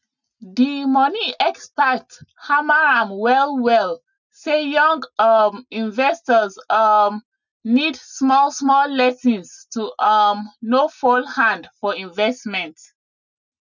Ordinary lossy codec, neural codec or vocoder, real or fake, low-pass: none; none; real; 7.2 kHz